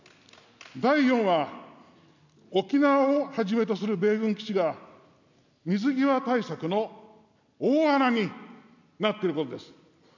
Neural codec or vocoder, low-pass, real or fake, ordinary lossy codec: none; 7.2 kHz; real; none